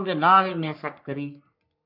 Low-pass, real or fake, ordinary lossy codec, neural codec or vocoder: 5.4 kHz; fake; MP3, 48 kbps; codec, 44.1 kHz, 3.4 kbps, Pupu-Codec